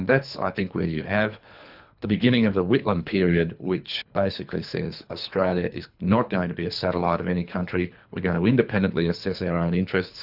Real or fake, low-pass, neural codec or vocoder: fake; 5.4 kHz; codec, 24 kHz, 3 kbps, HILCodec